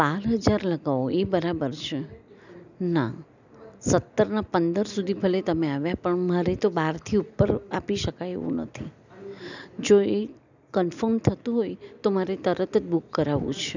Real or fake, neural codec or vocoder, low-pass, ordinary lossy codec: real; none; 7.2 kHz; none